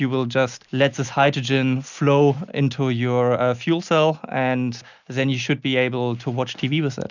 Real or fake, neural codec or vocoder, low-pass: real; none; 7.2 kHz